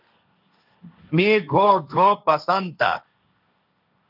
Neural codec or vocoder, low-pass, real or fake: codec, 16 kHz, 1.1 kbps, Voila-Tokenizer; 5.4 kHz; fake